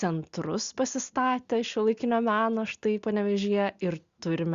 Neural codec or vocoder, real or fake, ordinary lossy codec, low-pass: none; real; Opus, 64 kbps; 7.2 kHz